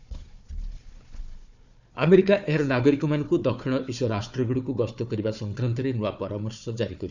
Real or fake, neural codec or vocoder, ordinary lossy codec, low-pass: fake; codec, 16 kHz, 4 kbps, FunCodec, trained on Chinese and English, 50 frames a second; none; 7.2 kHz